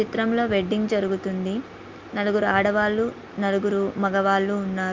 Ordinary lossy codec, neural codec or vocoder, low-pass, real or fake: Opus, 24 kbps; none; 7.2 kHz; real